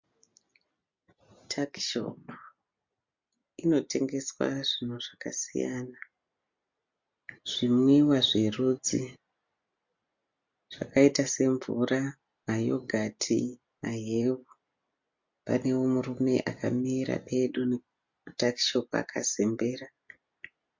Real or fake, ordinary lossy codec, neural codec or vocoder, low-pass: real; MP3, 48 kbps; none; 7.2 kHz